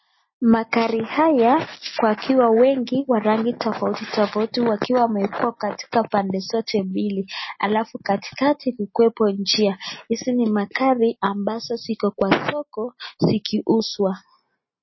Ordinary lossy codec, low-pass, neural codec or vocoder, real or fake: MP3, 24 kbps; 7.2 kHz; none; real